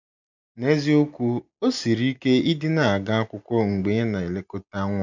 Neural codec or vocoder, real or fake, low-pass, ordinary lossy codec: none; real; 7.2 kHz; MP3, 64 kbps